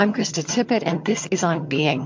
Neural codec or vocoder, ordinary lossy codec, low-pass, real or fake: vocoder, 22.05 kHz, 80 mel bands, HiFi-GAN; MP3, 48 kbps; 7.2 kHz; fake